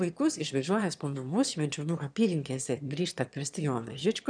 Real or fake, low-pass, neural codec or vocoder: fake; 9.9 kHz; autoencoder, 22.05 kHz, a latent of 192 numbers a frame, VITS, trained on one speaker